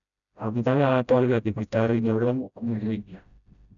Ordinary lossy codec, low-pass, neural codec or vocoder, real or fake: none; 7.2 kHz; codec, 16 kHz, 0.5 kbps, FreqCodec, smaller model; fake